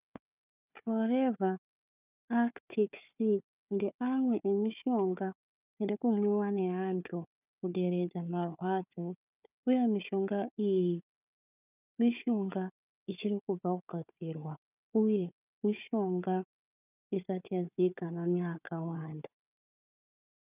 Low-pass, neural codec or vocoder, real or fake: 3.6 kHz; codec, 16 kHz, 4 kbps, FunCodec, trained on Chinese and English, 50 frames a second; fake